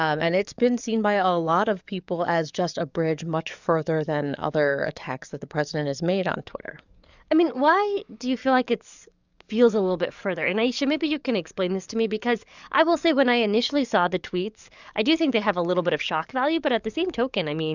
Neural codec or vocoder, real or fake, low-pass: codec, 44.1 kHz, 7.8 kbps, DAC; fake; 7.2 kHz